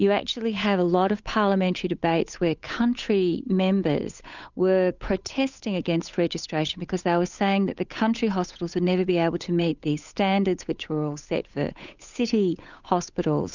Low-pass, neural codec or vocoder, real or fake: 7.2 kHz; codec, 16 kHz, 8 kbps, FunCodec, trained on Chinese and English, 25 frames a second; fake